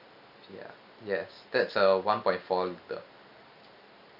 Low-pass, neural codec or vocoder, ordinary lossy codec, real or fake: 5.4 kHz; none; none; real